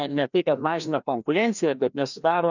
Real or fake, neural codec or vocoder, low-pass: fake; codec, 16 kHz, 1 kbps, FreqCodec, larger model; 7.2 kHz